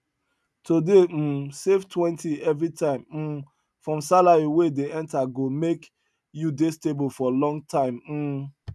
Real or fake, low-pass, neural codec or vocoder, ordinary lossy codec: real; none; none; none